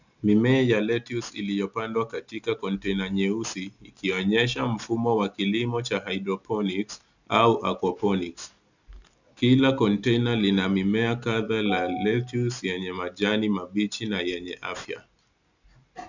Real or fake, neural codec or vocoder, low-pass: real; none; 7.2 kHz